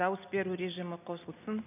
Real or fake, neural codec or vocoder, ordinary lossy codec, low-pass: real; none; none; 3.6 kHz